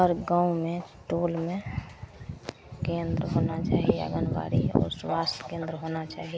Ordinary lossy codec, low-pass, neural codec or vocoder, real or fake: none; none; none; real